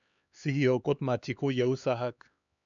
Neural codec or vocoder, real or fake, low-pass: codec, 16 kHz, 4 kbps, X-Codec, HuBERT features, trained on LibriSpeech; fake; 7.2 kHz